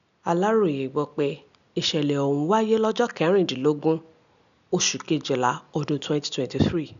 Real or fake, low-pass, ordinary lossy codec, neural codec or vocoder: real; 7.2 kHz; MP3, 96 kbps; none